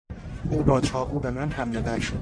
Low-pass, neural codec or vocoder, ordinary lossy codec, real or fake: 9.9 kHz; codec, 44.1 kHz, 1.7 kbps, Pupu-Codec; AAC, 64 kbps; fake